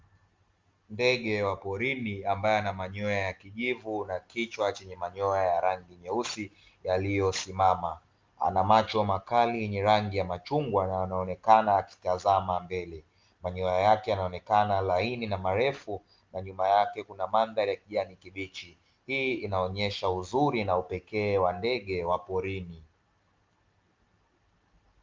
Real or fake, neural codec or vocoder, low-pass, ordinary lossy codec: real; none; 7.2 kHz; Opus, 32 kbps